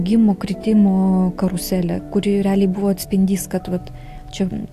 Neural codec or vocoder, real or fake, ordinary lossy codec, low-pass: none; real; AAC, 64 kbps; 14.4 kHz